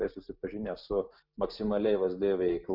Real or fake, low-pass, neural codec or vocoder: real; 5.4 kHz; none